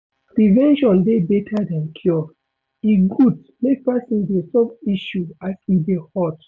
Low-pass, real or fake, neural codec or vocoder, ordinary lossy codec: none; real; none; none